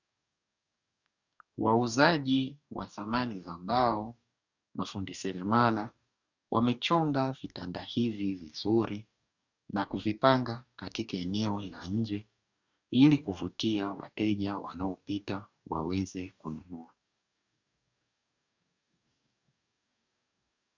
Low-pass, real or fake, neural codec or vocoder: 7.2 kHz; fake; codec, 44.1 kHz, 2.6 kbps, DAC